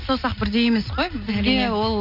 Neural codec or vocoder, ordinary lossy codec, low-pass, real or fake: none; none; 5.4 kHz; real